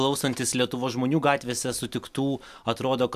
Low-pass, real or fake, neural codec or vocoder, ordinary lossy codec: 14.4 kHz; real; none; AAC, 96 kbps